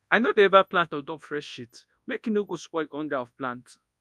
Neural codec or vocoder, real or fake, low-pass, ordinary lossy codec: codec, 24 kHz, 0.9 kbps, WavTokenizer, large speech release; fake; none; none